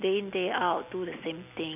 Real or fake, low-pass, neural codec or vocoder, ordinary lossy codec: real; 3.6 kHz; none; none